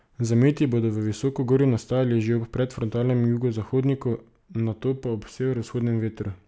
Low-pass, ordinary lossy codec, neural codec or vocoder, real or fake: none; none; none; real